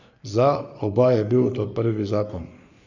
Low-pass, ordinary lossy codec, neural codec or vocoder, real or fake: 7.2 kHz; none; codec, 44.1 kHz, 3.4 kbps, Pupu-Codec; fake